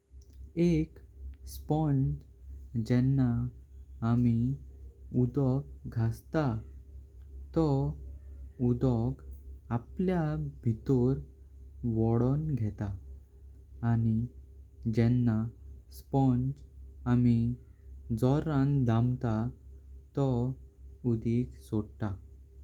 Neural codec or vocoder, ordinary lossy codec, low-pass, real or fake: none; Opus, 32 kbps; 19.8 kHz; real